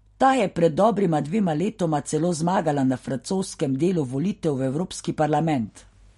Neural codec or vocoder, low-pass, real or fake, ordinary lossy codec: none; 19.8 kHz; real; MP3, 48 kbps